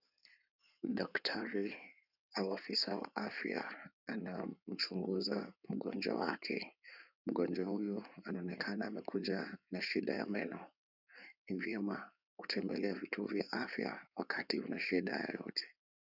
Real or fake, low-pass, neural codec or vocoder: fake; 5.4 kHz; codec, 16 kHz in and 24 kHz out, 2.2 kbps, FireRedTTS-2 codec